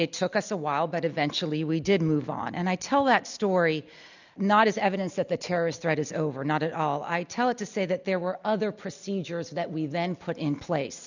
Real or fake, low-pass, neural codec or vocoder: real; 7.2 kHz; none